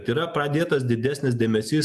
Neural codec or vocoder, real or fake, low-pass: none; real; 14.4 kHz